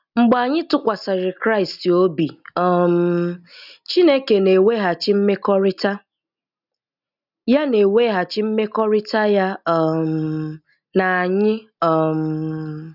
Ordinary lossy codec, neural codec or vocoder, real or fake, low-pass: none; none; real; 5.4 kHz